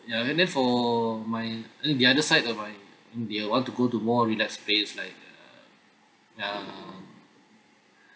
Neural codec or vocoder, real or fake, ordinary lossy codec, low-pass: none; real; none; none